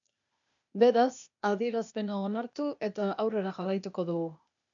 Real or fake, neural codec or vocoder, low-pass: fake; codec, 16 kHz, 0.8 kbps, ZipCodec; 7.2 kHz